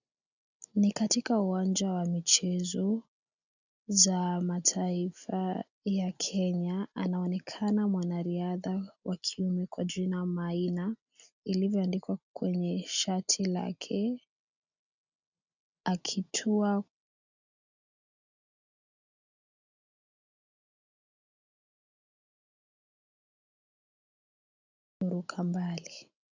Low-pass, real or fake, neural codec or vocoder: 7.2 kHz; real; none